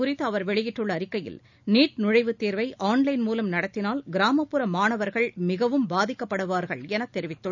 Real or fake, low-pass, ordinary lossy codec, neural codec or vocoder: real; 7.2 kHz; none; none